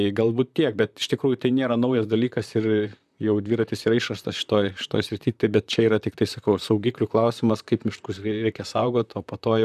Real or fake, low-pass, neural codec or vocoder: fake; 14.4 kHz; vocoder, 44.1 kHz, 128 mel bands, Pupu-Vocoder